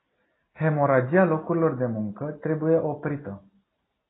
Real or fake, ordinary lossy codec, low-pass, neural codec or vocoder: real; AAC, 16 kbps; 7.2 kHz; none